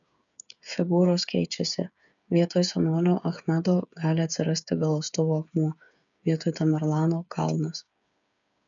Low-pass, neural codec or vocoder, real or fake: 7.2 kHz; codec, 16 kHz, 8 kbps, FreqCodec, smaller model; fake